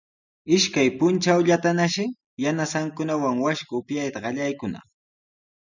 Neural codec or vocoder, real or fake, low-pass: none; real; 7.2 kHz